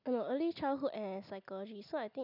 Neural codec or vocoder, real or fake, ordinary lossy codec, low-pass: none; real; none; 5.4 kHz